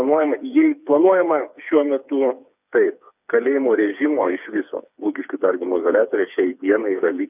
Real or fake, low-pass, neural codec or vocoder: fake; 3.6 kHz; codec, 16 kHz, 4 kbps, FreqCodec, smaller model